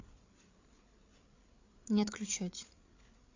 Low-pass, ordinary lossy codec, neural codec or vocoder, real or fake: 7.2 kHz; AAC, 48 kbps; codec, 16 kHz, 8 kbps, FreqCodec, larger model; fake